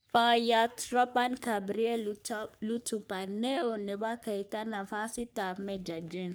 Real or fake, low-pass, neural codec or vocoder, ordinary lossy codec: fake; none; codec, 44.1 kHz, 3.4 kbps, Pupu-Codec; none